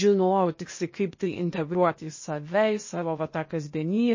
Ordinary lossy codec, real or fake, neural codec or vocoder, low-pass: MP3, 32 kbps; fake; codec, 16 kHz, 0.8 kbps, ZipCodec; 7.2 kHz